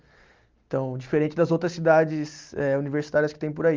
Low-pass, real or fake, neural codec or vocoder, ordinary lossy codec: 7.2 kHz; real; none; Opus, 24 kbps